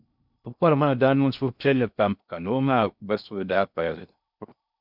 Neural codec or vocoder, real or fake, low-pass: codec, 16 kHz in and 24 kHz out, 0.6 kbps, FocalCodec, streaming, 4096 codes; fake; 5.4 kHz